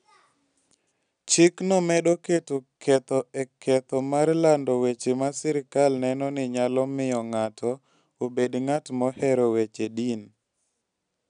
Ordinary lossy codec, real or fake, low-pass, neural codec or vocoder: none; real; 9.9 kHz; none